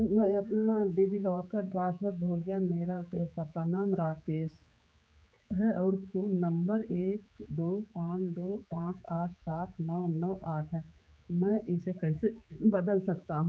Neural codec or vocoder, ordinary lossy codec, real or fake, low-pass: codec, 16 kHz, 4 kbps, X-Codec, HuBERT features, trained on general audio; none; fake; none